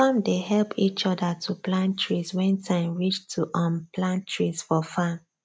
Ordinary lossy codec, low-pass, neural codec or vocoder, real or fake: none; none; none; real